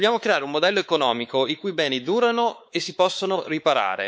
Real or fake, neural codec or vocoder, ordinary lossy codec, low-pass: fake; codec, 16 kHz, 4 kbps, X-Codec, WavLM features, trained on Multilingual LibriSpeech; none; none